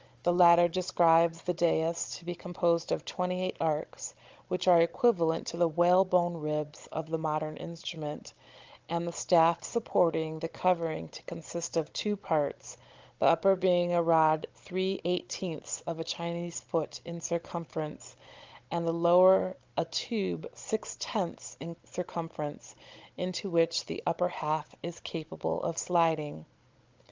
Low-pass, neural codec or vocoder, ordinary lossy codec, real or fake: 7.2 kHz; codec, 16 kHz, 16 kbps, FunCodec, trained on Chinese and English, 50 frames a second; Opus, 24 kbps; fake